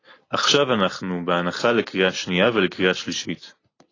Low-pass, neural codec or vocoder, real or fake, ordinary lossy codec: 7.2 kHz; none; real; AAC, 32 kbps